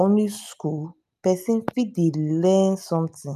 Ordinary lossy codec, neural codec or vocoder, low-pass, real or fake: none; none; 14.4 kHz; real